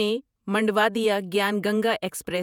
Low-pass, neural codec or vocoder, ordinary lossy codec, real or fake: 19.8 kHz; vocoder, 48 kHz, 128 mel bands, Vocos; none; fake